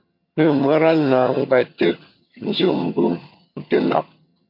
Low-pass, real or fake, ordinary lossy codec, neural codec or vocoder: 5.4 kHz; fake; MP3, 32 kbps; vocoder, 22.05 kHz, 80 mel bands, HiFi-GAN